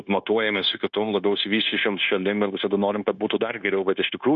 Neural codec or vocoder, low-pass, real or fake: codec, 16 kHz, 0.9 kbps, LongCat-Audio-Codec; 7.2 kHz; fake